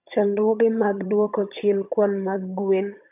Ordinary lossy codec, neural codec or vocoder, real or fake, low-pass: AAC, 32 kbps; vocoder, 22.05 kHz, 80 mel bands, HiFi-GAN; fake; 3.6 kHz